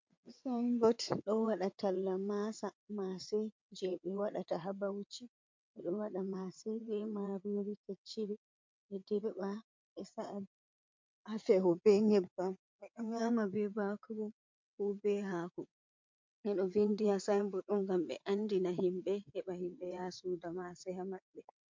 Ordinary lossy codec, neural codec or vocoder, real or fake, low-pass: MP3, 48 kbps; vocoder, 22.05 kHz, 80 mel bands, WaveNeXt; fake; 7.2 kHz